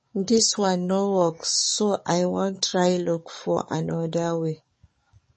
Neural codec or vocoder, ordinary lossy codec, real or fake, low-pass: codec, 44.1 kHz, 7.8 kbps, DAC; MP3, 32 kbps; fake; 10.8 kHz